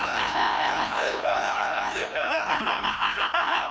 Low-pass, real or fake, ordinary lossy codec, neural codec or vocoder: none; fake; none; codec, 16 kHz, 1 kbps, FreqCodec, larger model